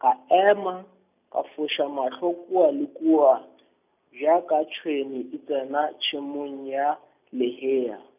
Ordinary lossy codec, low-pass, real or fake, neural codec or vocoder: none; 3.6 kHz; real; none